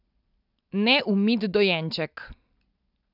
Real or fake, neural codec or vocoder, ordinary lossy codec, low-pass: real; none; none; 5.4 kHz